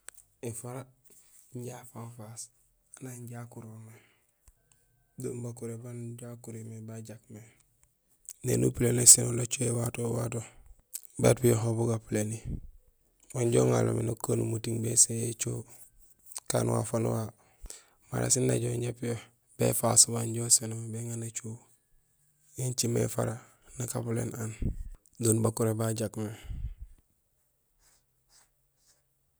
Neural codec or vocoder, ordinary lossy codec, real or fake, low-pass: none; none; real; none